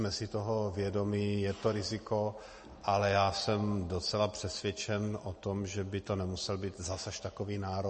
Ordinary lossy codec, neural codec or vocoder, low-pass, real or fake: MP3, 32 kbps; none; 10.8 kHz; real